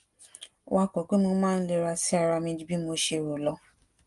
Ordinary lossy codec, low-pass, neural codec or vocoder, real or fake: Opus, 32 kbps; 10.8 kHz; none; real